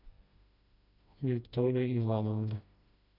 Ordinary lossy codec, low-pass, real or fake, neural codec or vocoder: none; 5.4 kHz; fake; codec, 16 kHz, 1 kbps, FreqCodec, smaller model